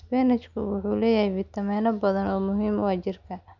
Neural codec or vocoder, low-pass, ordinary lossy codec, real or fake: none; 7.2 kHz; none; real